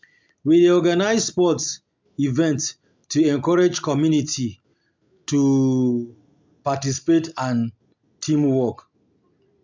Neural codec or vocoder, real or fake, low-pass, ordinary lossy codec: none; real; 7.2 kHz; MP3, 64 kbps